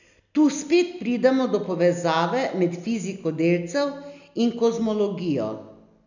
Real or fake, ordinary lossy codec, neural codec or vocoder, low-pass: real; none; none; 7.2 kHz